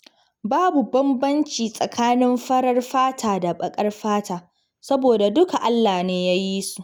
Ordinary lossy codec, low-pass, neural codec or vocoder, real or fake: none; none; none; real